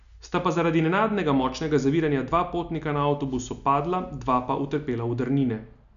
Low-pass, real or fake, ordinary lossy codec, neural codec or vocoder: 7.2 kHz; real; none; none